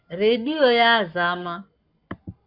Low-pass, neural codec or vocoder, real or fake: 5.4 kHz; codec, 44.1 kHz, 7.8 kbps, Pupu-Codec; fake